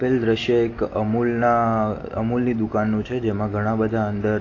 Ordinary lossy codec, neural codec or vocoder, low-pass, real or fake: AAC, 32 kbps; none; 7.2 kHz; real